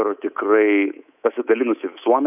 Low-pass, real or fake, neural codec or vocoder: 3.6 kHz; fake; codec, 24 kHz, 3.1 kbps, DualCodec